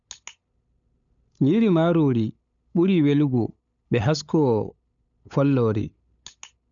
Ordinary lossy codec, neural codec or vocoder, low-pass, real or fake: none; codec, 16 kHz, 8 kbps, FunCodec, trained on LibriTTS, 25 frames a second; 7.2 kHz; fake